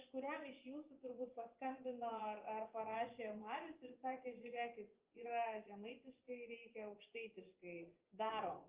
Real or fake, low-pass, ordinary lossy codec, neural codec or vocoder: fake; 3.6 kHz; Opus, 24 kbps; vocoder, 24 kHz, 100 mel bands, Vocos